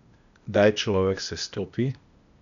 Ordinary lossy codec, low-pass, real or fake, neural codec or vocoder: none; 7.2 kHz; fake; codec, 16 kHz, 0.8 kbps, ZipCodec